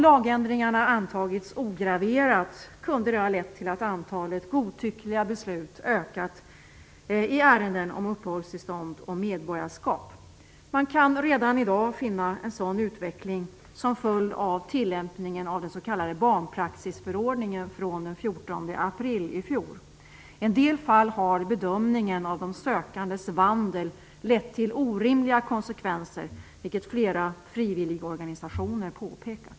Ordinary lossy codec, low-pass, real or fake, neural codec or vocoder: none; none; real; none